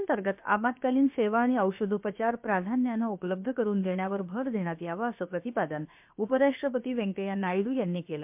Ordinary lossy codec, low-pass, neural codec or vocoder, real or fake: MP3, 32 kbps; 3.6 kHz; codec, 16 kHz, about 1 kbps, DyCAST, with the encoder's durations; fake